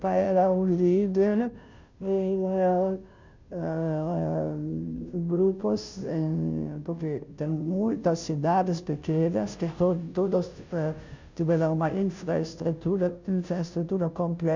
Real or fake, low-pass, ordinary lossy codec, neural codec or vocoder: fake; 7.2 kHz; MP3, 64 kbps; codec, 16 kHz, 0.5 kbps, FunCodec, trained on Chinese and English, 25 frames a second